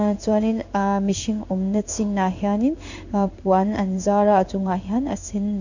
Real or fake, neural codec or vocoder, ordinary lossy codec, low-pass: fake; codec, 16 kHz in and 24 kHz out, 1 kbps, XY-Tokenizer; none; 7.2 kHz